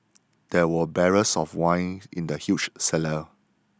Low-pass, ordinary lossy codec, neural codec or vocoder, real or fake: none; none; none; real